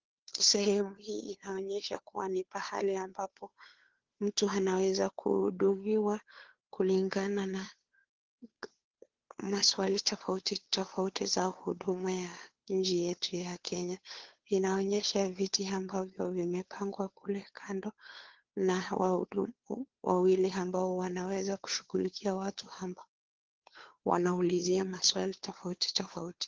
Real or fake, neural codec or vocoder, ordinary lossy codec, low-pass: fake; codec, 16 kHz, 2 kbps, FunCodec, trained on Chinese and English, 25 frames a second; Opus, 16 kbps; 7.2 kHz